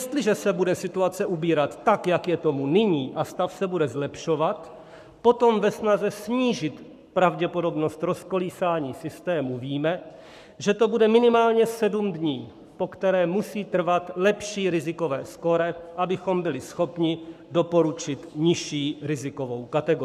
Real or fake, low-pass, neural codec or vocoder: fake; 14.4 kHz; codec, 44.1 kHz, 7.8 kbps, Pupu-Codec